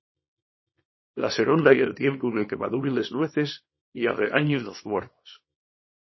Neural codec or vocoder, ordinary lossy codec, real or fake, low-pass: codec, 24 kHz, 0.9 kbps, WavTokenizer, small release; MP3, 24 kbps; fake; 7.2 kHz